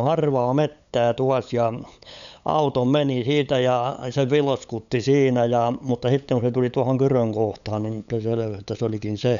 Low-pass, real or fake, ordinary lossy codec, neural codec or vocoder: 7.2 kHz; fake; none; codec, 16 kHz, 8 kbps, FunCodec, trained on LibriTTS, 25 frames a second